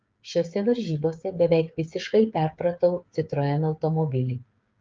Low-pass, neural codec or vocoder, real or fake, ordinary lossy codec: 7.2 kHz; codec, 16 kHz, 8 kbps, FreqCodec, smaller model; fake; Opus, 24 kbps